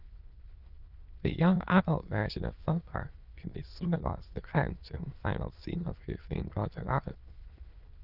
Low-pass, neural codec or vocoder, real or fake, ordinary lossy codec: 5.4 kHz; autoencoder, 22.05 kHz, a latent of 192 numbers a frame, VITS, trained on many speakers; fake; Opus, 32 kbps